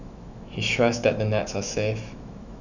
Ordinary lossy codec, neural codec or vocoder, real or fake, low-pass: none; autoencoder, 48 kHz, 128 numbers a frame, DAC-VAE, trained on Japanese speech; fake; 7.2 kHz